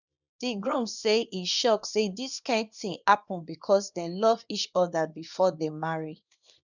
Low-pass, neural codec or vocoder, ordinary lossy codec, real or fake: 7.2 kHz; codec, 24 kHz, 0.9 kbps, WavTokenizer, small release; none; fake